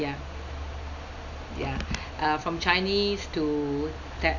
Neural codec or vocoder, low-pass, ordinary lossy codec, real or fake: none; 7.2 kHz; none; real